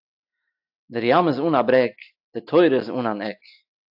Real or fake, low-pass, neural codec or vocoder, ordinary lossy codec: real; 5.4 kHz; none; AAC, 48 kbps